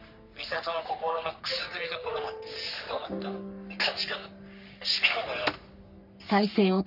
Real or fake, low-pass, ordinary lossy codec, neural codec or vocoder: fake; 5.4 kHz; none; codec, 44.1 kHz, 3.4 kbps, Pupu-Codec